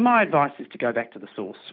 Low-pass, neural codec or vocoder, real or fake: 5.4 kHz; none; real